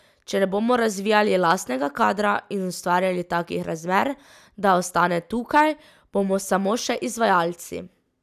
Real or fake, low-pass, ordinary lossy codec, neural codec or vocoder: real; 14.4 kHz; none; none